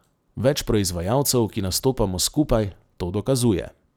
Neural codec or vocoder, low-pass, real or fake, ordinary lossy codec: vocoder, 44.1 kHz, 128 mel bands every 256 samples, BigVGAN v2; none; fake; none